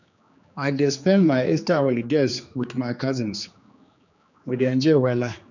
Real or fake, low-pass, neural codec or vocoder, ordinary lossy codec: fake; 7.2 kHz; codec, 16 kHz, 2 kbps, X-Codec, HuBERT features, trained on general audio; none